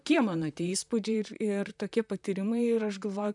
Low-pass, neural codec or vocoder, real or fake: 10.8 kHz; vocoder, 44.1 kHz, 128 mel bands, Pupu-Vocoder; fake